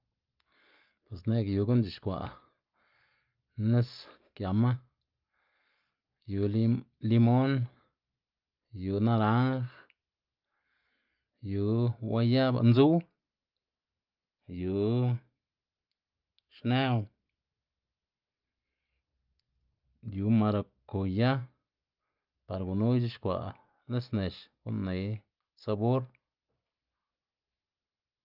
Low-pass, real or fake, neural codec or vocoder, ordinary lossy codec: 5.4 kHz; real; none; Opus, 24 kbps